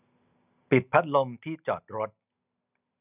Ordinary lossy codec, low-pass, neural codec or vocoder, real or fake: none; 3.6 kHz; none; real